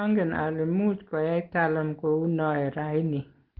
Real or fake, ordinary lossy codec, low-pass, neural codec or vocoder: real; Opus, 16 kbps; 5.4 kHz; none